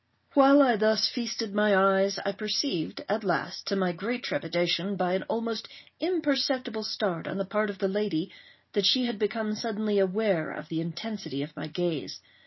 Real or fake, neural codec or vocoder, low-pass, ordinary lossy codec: real; none; 7.2 kHz; MP3, 24 kbps